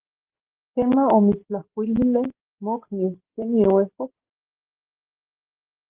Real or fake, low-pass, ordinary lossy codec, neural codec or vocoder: real; 3.6 kHz; Opus, 16 kbps; none